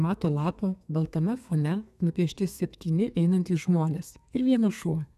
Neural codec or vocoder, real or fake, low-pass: codec, 32 kHz, 1.9 kbps, SNAC; fake; 14.4 kHz